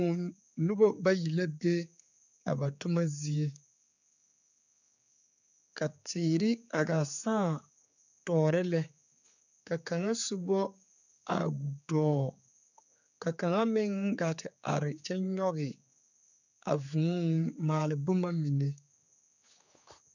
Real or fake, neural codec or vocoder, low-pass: fake; codec, 16 kHz, 4 kbps, X-Codec, HuBERT features, trained on general audio; 7.2 kHz